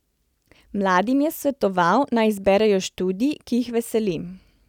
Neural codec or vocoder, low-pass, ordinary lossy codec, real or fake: none; 19.8 kHz; none; real